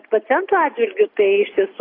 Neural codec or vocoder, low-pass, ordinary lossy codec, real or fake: none; 5.4 kHz; AAC, 24 kbps; real